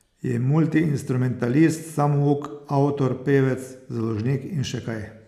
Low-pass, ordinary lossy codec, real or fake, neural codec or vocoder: 14.4 kHz; none; real; none